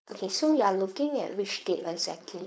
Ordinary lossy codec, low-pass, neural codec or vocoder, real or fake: none; none; codec, 16 kHz, 4.8 kbps, FACodec; fake